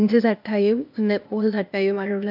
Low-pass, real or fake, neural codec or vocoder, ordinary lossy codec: 5.4 kHz; fake; codec, 16 kHz, 0.8 kbps, ZipCodec; none